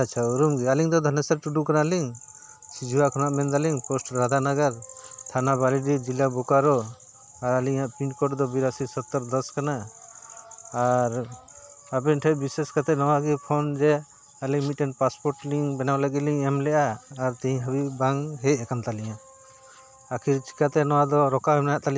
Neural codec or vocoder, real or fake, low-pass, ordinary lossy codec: none; real; none; none